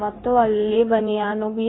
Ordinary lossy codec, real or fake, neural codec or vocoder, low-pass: AAC, 16 kbps; fake; codec, 16 kHz in and 24 kHz out, 1.1 kbps, FireRedTTS-2 codec; 7.2 kHz